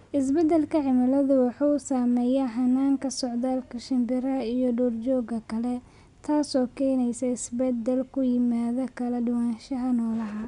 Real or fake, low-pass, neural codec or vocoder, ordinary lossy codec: real; 10.8 kHz; none; MP3, 96 kbps